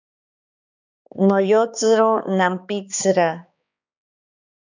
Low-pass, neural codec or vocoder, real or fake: 7.2 kHz; codec, 16 kHz, 2 kbps, X-Codec, HuBERT features, trained on balanced general audio; fake